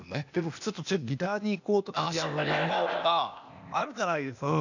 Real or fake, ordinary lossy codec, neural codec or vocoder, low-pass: fake; none; codec, 16 kHz, 0.8 kbps, ZipCodec; 7.2 kHz